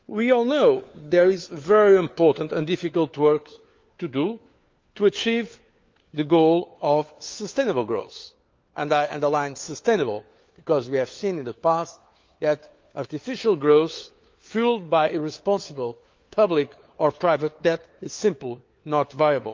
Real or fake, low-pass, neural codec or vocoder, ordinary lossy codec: fake; 7.2 kHz; codec, 16 kHz, 4 kbps, FunCodec, trained on LibriTTS, 50 frames a second; Opus, 32 kbps